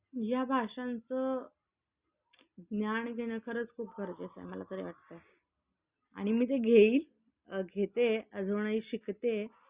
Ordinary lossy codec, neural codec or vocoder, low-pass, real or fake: Opus, 64 kbps; none; 3.6 kHz; real